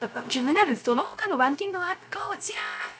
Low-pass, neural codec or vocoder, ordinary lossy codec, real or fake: none; codec, 16 kHz, 0.3 kbps, FocalCodec; none; fake